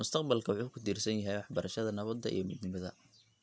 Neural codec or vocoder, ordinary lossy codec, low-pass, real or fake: none; none; none; real